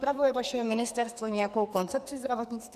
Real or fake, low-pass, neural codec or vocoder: fake; 14.4 kHz; codec, 44.1 kHz, 2.6 kbps, SNAC